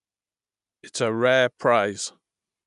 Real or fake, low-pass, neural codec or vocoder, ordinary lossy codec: real; 10.8 kHz; none; none